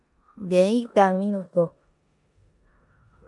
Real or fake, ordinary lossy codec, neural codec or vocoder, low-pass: fake; MP3, 64 kbps; codec, 16 kHz in and 24 kHz out, 0.9 kbps, LongCat-Audio-Codec, four codebook decoder; 10.8 kHz